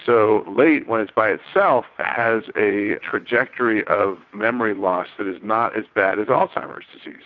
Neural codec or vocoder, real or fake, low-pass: vocoder, 22.05 kHz, 80 mel bands, WaveNeXt; fake; 7.2 kHz